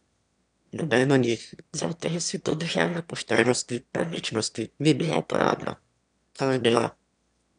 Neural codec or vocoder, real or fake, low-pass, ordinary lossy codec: autoencoder, 22.05 kHz, a latent of 192 numbers a frame, VITS, trained on one speaker; fake; 9.9 kHz; none